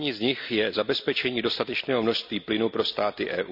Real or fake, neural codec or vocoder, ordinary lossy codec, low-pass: real; none; none; 5.4 kHz